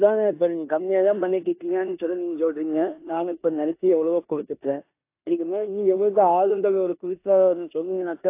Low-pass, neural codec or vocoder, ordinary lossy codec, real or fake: 3.6 kHz; codec, 16 kHz in and 24 kHz out, 0.9 kbps, LongCat-Audio-Codec, four codebook decoder; AAC, 24 kbps; fake